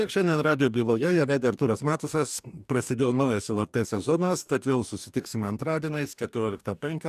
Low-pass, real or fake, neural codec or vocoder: 14.4 kHz; fake; codec, 44.1 kHz, 2.6 kbps, DAC